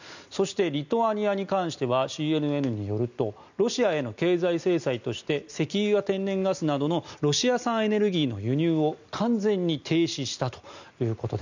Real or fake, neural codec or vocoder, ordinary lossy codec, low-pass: real; none; none; 7.2 kHz